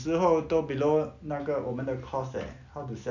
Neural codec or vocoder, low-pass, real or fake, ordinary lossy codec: none; 7.2 kHz; real; none